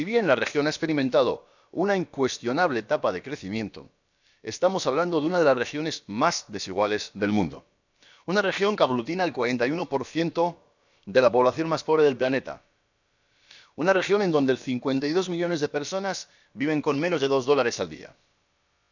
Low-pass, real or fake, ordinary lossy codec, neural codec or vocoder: 7.2 kHz; fake; none; codec, 16 kHz, about 1 kbps, DyCAST, with the encoder's durations